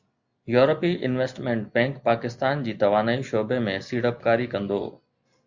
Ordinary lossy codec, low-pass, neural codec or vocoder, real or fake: Opus, 64 kbps; 7.2 kHz; none; real